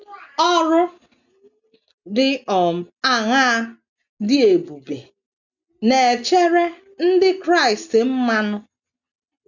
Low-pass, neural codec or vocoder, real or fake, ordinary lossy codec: 7.2 kHz; none; real; none